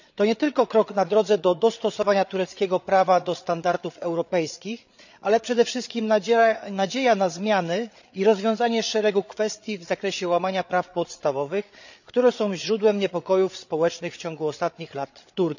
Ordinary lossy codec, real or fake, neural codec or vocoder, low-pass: none; fake; codec, 16 kHz, 8 kbps, FreqCodec, larger model; 7.2 kHz